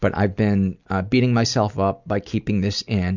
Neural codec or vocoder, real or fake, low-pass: none; real; 7.2 kHz